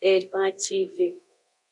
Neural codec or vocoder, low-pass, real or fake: codec, 24 kHz, 0.9 kbps, DualCodec; 10.8 kHz; fake